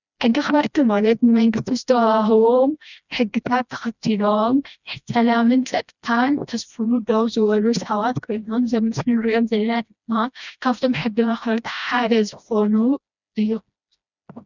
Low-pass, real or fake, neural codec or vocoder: 7.2 kHz; fake; codec, 16 kHz, 1 kbps, FreqCodec, smaller model